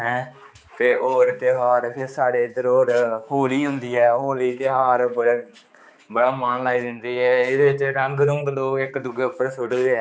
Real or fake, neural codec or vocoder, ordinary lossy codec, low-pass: fake; codec, 16 kHz, 4 kbps, X-Codec, HuBERT features, trained on balanced general audio; none; none